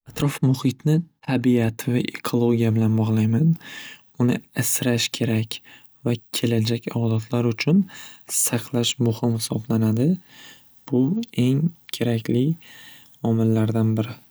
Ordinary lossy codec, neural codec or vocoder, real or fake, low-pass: none; none; real; none